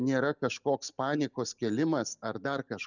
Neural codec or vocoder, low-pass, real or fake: none; 7.2 kHz; real